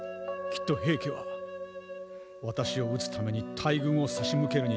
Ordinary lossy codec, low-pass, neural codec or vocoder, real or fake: none; none; none; real